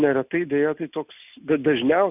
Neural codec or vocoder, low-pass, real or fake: none; 3.6 kHz; real